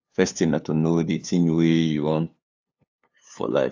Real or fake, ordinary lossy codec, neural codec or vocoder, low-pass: fake; AAC, 48 kbps; codec, 16 kHz, 2 kbps, FunCodec, trained on LibriTTS, 25 frames a second; 7.2 kHz